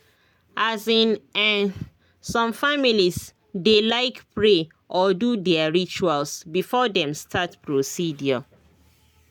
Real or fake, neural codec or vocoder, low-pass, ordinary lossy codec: real; none; 19.8 kHz; none